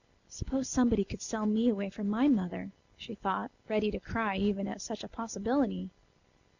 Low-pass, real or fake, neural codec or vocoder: 7.2 kHz; real; none